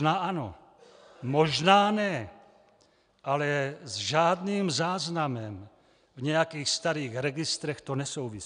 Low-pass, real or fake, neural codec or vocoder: 9.9 kHz; real; none